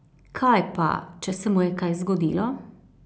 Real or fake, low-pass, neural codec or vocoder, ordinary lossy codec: real; none; none; none